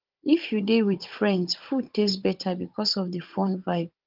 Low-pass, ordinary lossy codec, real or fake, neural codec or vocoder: 5.4 kHz; Opus, 24 kbps; fake; codec, 16 kHz, 16 kbps, FunCodec, trained on Chinese and English, 50 frames a second